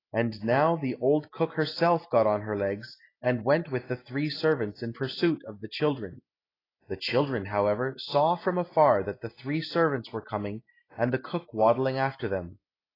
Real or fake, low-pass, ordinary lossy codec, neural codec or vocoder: real; 5.4 kHz; AAC, 24 kbps; none